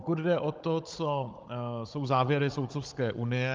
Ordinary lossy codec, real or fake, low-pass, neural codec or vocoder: Opus, 24 kbps; fake; 7.2 kHz; codec, 16 kHz, 16 kbps, FunCodec, trained on Chinese and English, 50 frames a second